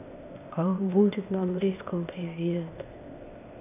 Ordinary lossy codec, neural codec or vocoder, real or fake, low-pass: none; codec, 16 kHz, 0.8 kbps, ZipCodec; fake; 3.6 kHz